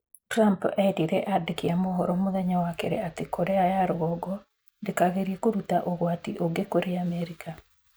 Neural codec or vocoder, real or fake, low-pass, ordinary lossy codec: none; real; none; none